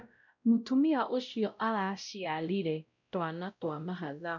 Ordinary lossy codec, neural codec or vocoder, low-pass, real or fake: none; codec, 16 kHz, 0.5 kbps, X-Codec, WavLM features, trained on Multilingual LibriSpeech; 7.2 kHz; fake